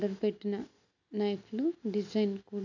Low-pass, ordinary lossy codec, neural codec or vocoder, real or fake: 7.2 kHz; none; none; real